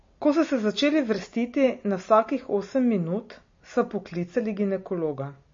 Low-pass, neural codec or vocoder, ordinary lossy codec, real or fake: 7.2 kHz; none; MP3, 32 kbps; real